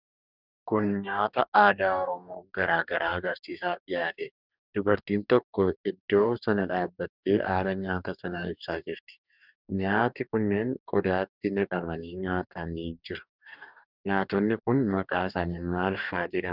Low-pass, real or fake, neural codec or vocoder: 5.4 kHz; fake; codec, 44.1 kHz, 2.6 kbps, DAC